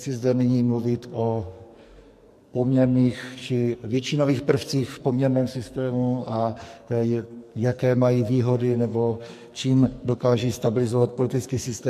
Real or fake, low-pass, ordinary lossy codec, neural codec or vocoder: fake; 14.4 kHz; MP3, 64 kbps; codec, 44.1 kHz, 2.6 kbps, SNAC